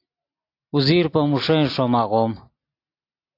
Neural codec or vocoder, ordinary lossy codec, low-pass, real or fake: none; AAC, 32 kbps; 5.4 kHz; real